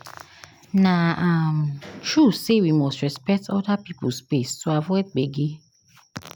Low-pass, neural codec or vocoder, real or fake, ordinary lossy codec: 19.8 kHz; none; real; none